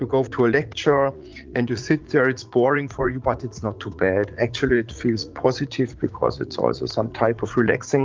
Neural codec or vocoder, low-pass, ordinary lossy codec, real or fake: vocoder, 44.1 kHz, 80 mel bands, Vocos; 7.2 kHz; Opus, 32 kbps; fake